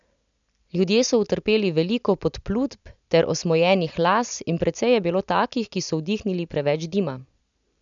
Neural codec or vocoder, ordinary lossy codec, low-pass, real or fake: none; none; 7.2 kHz; real